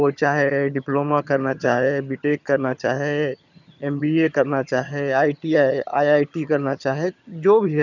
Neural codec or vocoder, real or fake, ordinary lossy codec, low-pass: vocoder, 22.05 kHz, 80 mel bands, HiFi-GAN; fake; none; 7.2 kHz